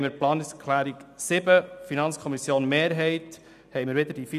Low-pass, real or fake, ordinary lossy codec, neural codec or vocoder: 14.4 kHz; real; none; none